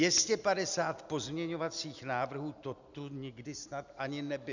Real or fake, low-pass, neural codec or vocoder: real; 7.2 kHz; none